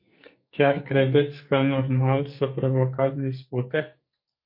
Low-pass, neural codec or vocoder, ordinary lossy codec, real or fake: 5.4 kHz; codec, 44.1 kHz, 2.6 kbps, SNAC; MP3, 32 kbps; fake